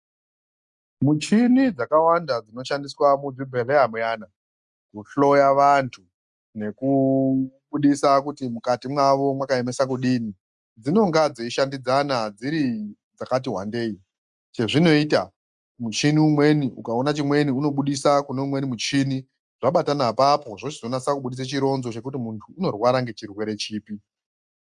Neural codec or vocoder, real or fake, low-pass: none; real; 10.8 kHz